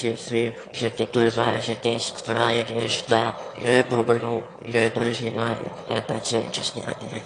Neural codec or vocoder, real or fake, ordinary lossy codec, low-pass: autoencoder, 22.05 kHz, a latent of 192 numbers a frame, VITS, trained on one speaker; fake; AAC, 48 kbps; 9.9 kHz